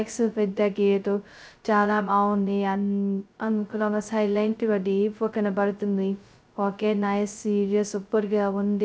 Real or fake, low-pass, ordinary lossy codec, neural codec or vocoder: fake; none; none; codec, 16 kHz, 0.2 kbps, FocalCodec